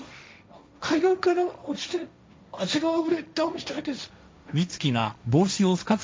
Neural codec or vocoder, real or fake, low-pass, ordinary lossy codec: codec, 16 kHz, 1.1 kbps, Voila-Tokenizer; fake; none; none